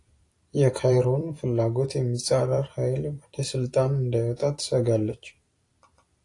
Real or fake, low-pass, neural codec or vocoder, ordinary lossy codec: fake; 10.8 kHz; vocoder, 44.1 kHz, 128 mel bands every 256 samples, BigVGAN v2; AAC, 48 kbps